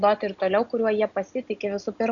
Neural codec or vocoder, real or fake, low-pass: none; real; 7.2 kHz